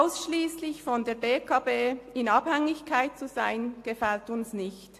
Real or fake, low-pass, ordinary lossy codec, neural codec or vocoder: real; 14.4 kHz; AAC, 64 kbps; none